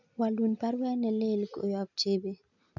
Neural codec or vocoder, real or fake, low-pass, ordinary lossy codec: none; real; 7.2 kHz; none